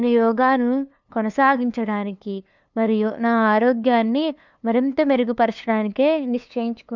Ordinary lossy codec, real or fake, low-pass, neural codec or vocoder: none; fake; 7.2 kHz; codec, 16 kHz, 2 kbps, FunCodec, trained on LibriTTS, 25 frames a second